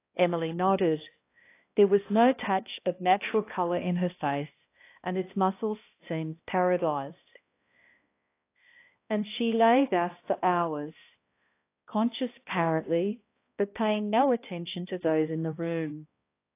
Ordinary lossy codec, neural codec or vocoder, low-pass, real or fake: AAC, 24 kbps; codec, 16 kHz, 1 kbps, X-Codec, HuBERT features, trained on balanced general audio; 3.6 kHz; fake